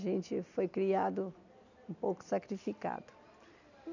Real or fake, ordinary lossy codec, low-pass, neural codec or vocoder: real; none; 7.2 kHz; none